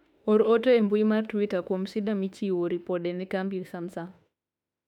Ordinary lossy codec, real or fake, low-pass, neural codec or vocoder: none; fake; 19.8 kHz; autoencoder, 48 kHz, 32 numbers a frame, DAC-VAE, trained on Japanese speech